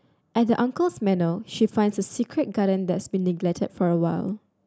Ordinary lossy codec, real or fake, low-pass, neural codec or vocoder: none; real; none; none